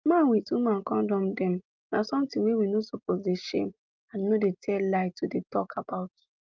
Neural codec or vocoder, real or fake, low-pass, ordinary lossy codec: none; real; 7.2 kHz; Opus, 32 kbps